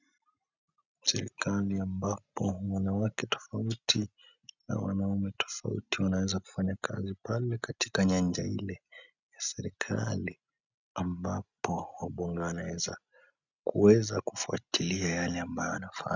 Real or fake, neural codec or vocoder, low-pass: real; none; 7.2 kHz